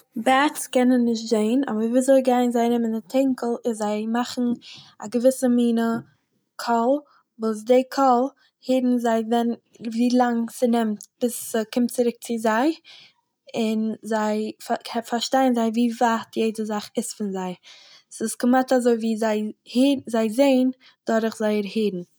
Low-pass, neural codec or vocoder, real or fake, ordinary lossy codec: none; none; real; none